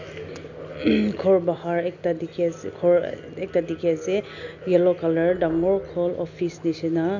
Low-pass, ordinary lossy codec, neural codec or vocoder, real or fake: 7.2 kHz; none; none; real